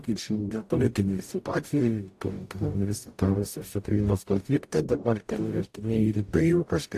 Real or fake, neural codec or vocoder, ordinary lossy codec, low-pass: fake; codec, 44.1 kHz, 0.9 kbps, DAC; AAC, 64 kbps; 14.4 kHz